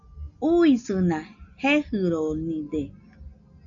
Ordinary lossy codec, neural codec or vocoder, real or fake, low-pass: AAC, 64 kbps; none; real; 7.2 kHz